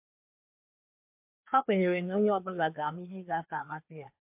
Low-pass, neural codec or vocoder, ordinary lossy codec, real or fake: 3.6 kHz; codec, 16 kHz, 2 kbps, FreqCodec, larger model; MP3, 32 kbps; fake